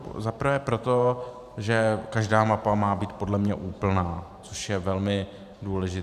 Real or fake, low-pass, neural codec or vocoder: fake; 14.4 kHz; vocoder, 44.1 kHz, 128 mel bands every 512 samples, BigVGAN v2